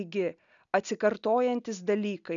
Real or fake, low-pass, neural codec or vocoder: real; 7.2 kHz; none